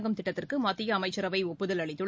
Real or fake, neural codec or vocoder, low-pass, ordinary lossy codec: real; none; none; none